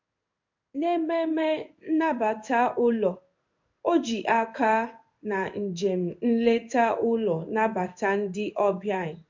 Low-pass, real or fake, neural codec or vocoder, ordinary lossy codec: 7.2 kHz; fake; codec, 16 kHz in and 24 kHz out, 1 kbps, XY-Tokenizer; MP3, 48 kbps